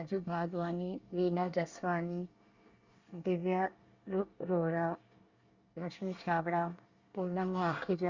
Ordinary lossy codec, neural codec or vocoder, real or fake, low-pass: Opus, 64 kbps; codec, 24 kHz, 1 kbps, SNAC; fake; 7.2 kHz